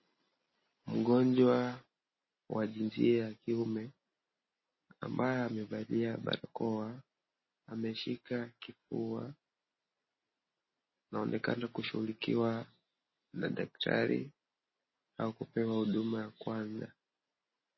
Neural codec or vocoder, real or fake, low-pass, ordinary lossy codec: none; real; 7.2 kHz; MP3, 24 kbps